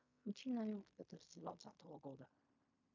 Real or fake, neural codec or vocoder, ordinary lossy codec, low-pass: fake; codec, 16 kHz in and 24 kHz out, 0.4 kbps, LongCat-Audio-Codec, fine tuned four codebook decoder; MP3, 48 kbps; 7.2 kHz